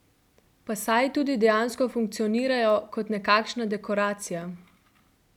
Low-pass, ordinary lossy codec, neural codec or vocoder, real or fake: 19.8 kHz; none; none; real